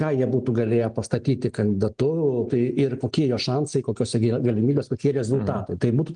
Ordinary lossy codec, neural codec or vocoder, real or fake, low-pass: Opus, 24 kbps; none; real; 9.9 kHz